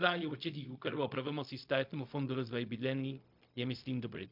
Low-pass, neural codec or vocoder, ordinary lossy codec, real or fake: 5.4 kHz; codec, 16 kHz, 0.4 kbps, LongCat-Audio-Codec; MP3, 48 kbps; fake